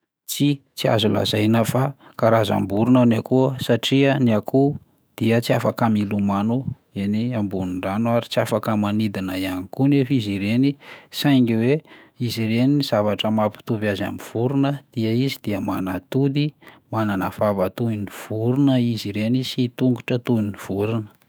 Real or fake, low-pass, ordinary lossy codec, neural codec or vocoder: fake; none; none; autoencoder, 48 kHz, 128 numbers a frame, DAC-VAE, trained on Japanese speech